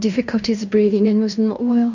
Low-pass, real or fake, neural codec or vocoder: 7.2 kHz; fake; codec, 16 kHz in and 24 kHz out, 0.9 kbps, LongCat-Audio-Codec, fine tuned four codebook decoder